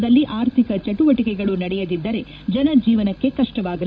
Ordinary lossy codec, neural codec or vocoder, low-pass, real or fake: none; codec, 16 kHz, 16 kbps, FreqCodec, larger model; none; fake